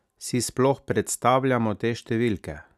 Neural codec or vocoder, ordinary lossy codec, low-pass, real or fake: none; none; 14.4 kHz; real